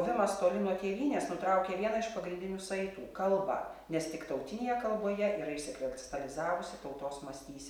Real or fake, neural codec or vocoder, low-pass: real; none; 19.8 kHz